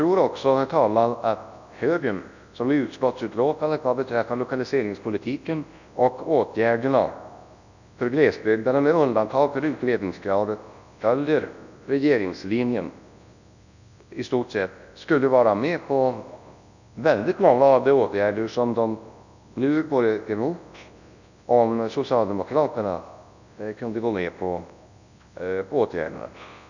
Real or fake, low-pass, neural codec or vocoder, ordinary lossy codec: fake; 7.2 kHz; codec, 24 kHz, 0.9 kbps, WavTokenizer, large speech release; none